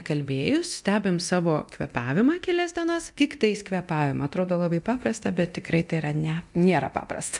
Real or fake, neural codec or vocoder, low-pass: fake; codec, 24 kHz, 0.9 kbps, DualCodec; 10.8 kHz